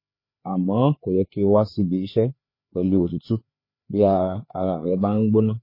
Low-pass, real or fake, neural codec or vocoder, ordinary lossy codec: 5.4 kHz; fake; codec, 16 kHz, 4 kbps, FreqCodec, larger model; MP3, 24 kbps